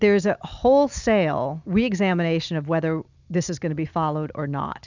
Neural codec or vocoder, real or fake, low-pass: none; real; 7.2 kHz